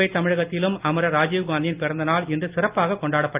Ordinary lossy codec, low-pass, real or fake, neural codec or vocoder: Opus, 32 kbps; 3.6 kHz; real; none